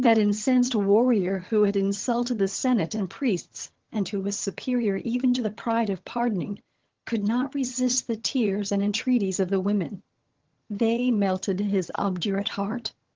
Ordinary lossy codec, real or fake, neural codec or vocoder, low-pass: Opus, 16 kbps; fake; vocoder, 22.05 kHz, 80 mel bands, HiFi-GAN; 7.2 kHz